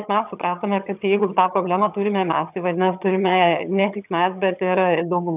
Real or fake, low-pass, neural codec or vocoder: fake; 3.6 kHz; vocoder, 22.05 kHz, 80 mel bands, HiFi-GAN